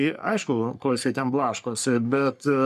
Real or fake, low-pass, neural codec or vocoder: fake; 14.4 kHz; codec, 44.1 kHz, 3.4 kbps, Pupu-Codec